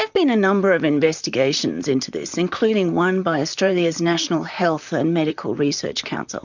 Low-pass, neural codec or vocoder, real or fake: 7.2 kHz; vocoder, 44.1 kHz, 128 mel bands, Pupu-Vocoder; fake